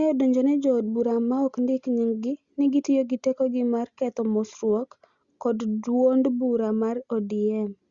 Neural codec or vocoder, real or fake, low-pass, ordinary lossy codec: none; real; 7.2 kHz; Opus, 64 kbps